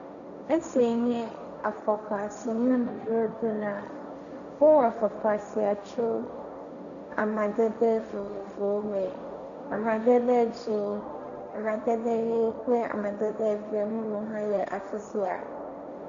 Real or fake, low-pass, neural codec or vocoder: fake; 7.2 kHz; codec, 16 kHz, 1.1 kbps, Voila-Tokenizer